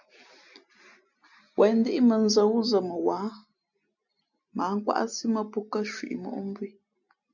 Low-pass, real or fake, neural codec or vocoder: 7.2 kHz; real; none